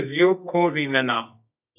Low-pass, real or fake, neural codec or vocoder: 3.6 kHz; fake; codec, 24 kHz, 0.9 kbps, WavTokenizer, medium music audio release